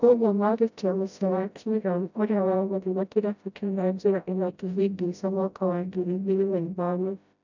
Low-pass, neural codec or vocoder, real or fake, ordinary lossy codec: 7.2 kHz; codec, 16 kHz, 0.5 kbps, FreqCodec, smaller model; fake; none